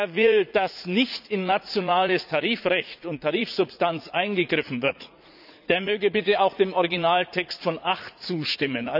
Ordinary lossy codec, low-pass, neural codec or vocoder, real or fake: none; 5.4 kHz; vocoder, 44.1 kHz, 80 mel bands, Vocos; fake